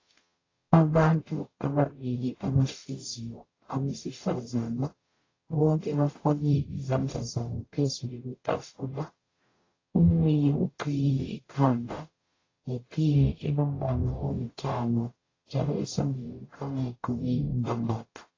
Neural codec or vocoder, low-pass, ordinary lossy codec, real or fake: codec, 44.1 kHz, 0.9 kbps, DAC; 7.2 kHz; AAC, 32 kbps; fake